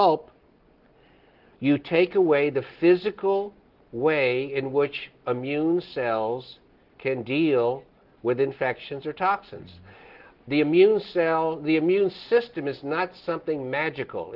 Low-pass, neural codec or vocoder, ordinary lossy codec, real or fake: 5.4 kHz; none; Opus, 16 kbps; real